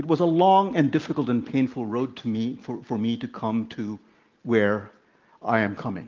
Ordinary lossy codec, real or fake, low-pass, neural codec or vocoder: Opus, 32 kbps; real; 7.2 kHz; none